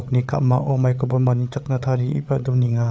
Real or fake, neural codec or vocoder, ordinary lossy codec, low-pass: fake; codec, 16 kHz, 8 kbps, FreqCodec, larger model; none; none